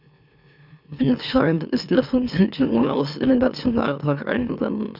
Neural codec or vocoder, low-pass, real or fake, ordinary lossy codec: autoencoder, 44.1 kHz, a latent of 192 numbers a frame, MeloTTS; 5.4 kHz; fake; none